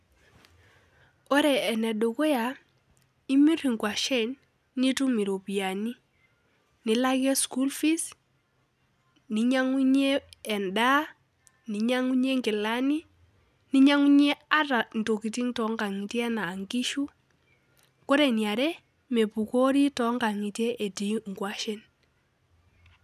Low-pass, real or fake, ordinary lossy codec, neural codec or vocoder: 14.4 kHz; real; none; none